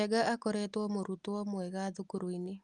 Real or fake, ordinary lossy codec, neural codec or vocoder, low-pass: real; Opus, 32 kbps; none; 10.8 kHz